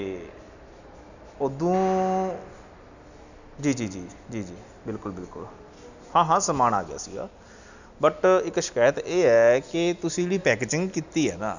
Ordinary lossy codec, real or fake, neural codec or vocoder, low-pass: none; real; none; 7.2 kHz